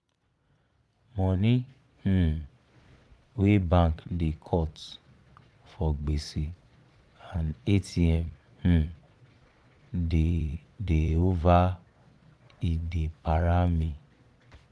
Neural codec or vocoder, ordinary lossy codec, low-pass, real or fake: vocoder, 22.05 kHz, 80 mel bands, Vocos; none; none; fake